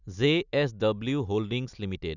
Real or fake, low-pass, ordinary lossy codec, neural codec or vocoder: real; 7.2 kHz; none; none